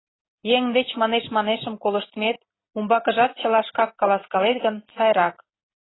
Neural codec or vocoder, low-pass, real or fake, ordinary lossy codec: none; 7.2 kHz; real; AAC, 16 kbps